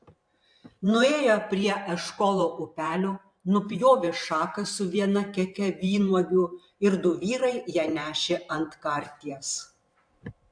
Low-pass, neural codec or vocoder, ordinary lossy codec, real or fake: 9.9 kHz; vocoder, 44.1 kHz, 128 mel bands every 512 samples, BigVGAN v2; MP3, 64 kbps; fake